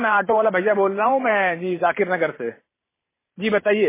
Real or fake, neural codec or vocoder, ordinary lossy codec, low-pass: fake; vocoder, 44.1 kHz, 128 mel bands every 512 samples, BigVGAN v2; MP3, 16 kbps; 3.6 kHz